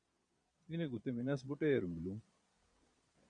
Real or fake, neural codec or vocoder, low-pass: fake; vocoder, 22.05 kHz, 80 mel bands, Vocos; 9.9 kHz